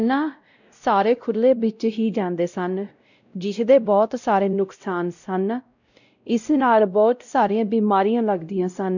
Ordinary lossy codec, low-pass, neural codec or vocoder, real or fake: none; 7.2 kHz; codec, 16 kHz, 0.5 kbps, X-Codec, WavLM features, trained on Multilingual LibriSpeech; fake